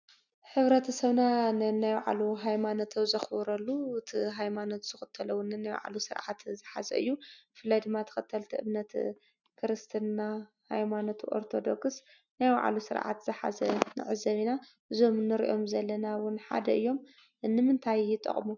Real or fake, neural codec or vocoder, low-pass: real; none; 7.2 kHz